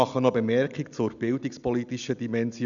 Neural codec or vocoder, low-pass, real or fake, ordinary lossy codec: none; 7.2 kHz; real; none